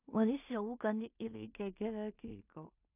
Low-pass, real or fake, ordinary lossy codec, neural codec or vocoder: 3.6 kHz; fake; none; codec, 16 kHz in and 24 kHz out, 0.4 kbps, LongCat-Audio-Codec, two codebook decoder